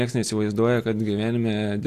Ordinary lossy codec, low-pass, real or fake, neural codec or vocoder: AAC, 64 kbps; 14.4 kHz; real; none